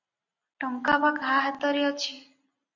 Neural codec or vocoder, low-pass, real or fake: none; 7.2 kHz; real